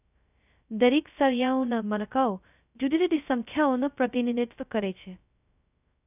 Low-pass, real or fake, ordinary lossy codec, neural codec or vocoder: 3.6 kHz; fake; none; codec, 16 kHz, 0.2 kbps, FocalCodec